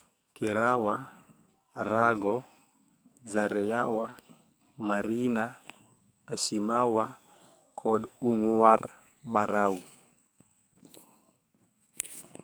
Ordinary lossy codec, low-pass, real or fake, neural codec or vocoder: none; none; fake; codec, 44.1 kHz, 2.6 kbps, SNAC